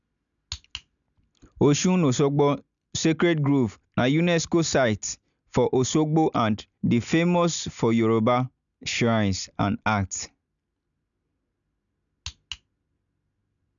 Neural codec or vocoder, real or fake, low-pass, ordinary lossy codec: none; real; 7.2 kHz; none